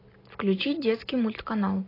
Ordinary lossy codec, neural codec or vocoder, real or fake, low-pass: AAC, 24 kbps; none; real; 5.4 kHz